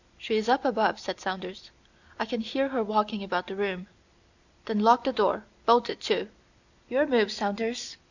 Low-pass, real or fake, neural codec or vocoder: 7.2 kHz; real; none